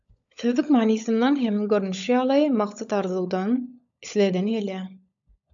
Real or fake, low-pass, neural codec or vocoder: fake; 7.2 kHz; codec, 16 kHz, 16 kbps, FunCodec, trained on LibriTTS, 50 frames a second